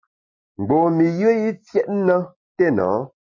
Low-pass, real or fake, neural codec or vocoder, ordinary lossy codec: 7.2 kHz; real; none; MP3, 32 kbps